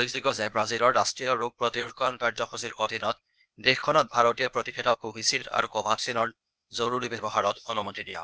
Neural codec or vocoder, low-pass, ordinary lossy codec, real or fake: codec, 16 kHz, 0.8 kbps, ZipCodec; none; none; fake